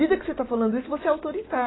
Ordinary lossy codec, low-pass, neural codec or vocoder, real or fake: AAC, 16 kbps; 7.2 kHz; none; real